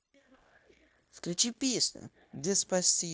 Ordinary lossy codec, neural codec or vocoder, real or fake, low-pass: none; codec, 16 kHz, 0.9 kbps, LongCat-Audio-Codec; fake; none